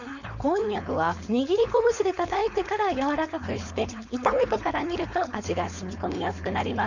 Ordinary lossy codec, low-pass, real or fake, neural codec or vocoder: none; 7.2 kHz; fake; codec, 16 kHz, 4.8 kbps, FACodec